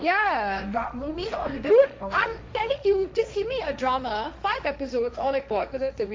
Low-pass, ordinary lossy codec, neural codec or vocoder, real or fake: none; none; codec, 16 kHz, 1.1 kbps, Voila-Tokenizer; fake